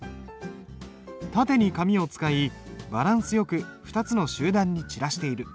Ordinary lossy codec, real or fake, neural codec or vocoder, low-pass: none; real; none; none